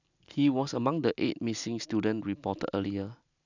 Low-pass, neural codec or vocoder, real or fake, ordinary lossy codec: 7.2 kHz; none; real; none